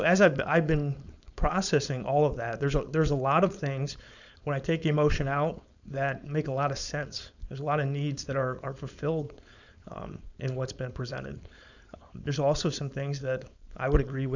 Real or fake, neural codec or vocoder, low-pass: fake; codec, 16 kHz, 4.8 kbps, FACodec; 7.2 kHz